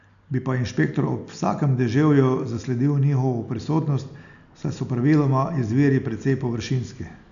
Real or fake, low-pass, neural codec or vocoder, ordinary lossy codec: real; 7.2 kHz; none; none